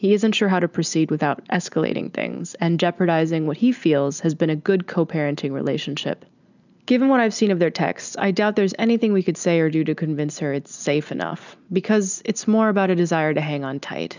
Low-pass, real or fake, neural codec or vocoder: 7.2 kHz; real; none